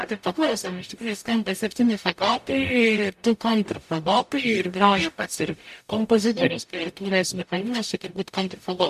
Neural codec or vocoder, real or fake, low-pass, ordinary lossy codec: codec, 44.1 kHz, 0.9 kbps, DAC; fake; 14.4 kHz; AAC, 96 kbps